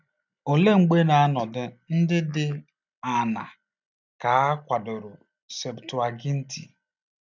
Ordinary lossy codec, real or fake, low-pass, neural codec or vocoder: none; real; 7.2 kHz; none